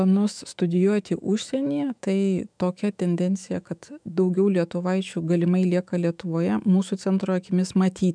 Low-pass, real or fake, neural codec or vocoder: 9.9 kHz; fake; autoencoder, 48 kHz, 128 numbers a frame, DAC-VAE, trained on Japanese speech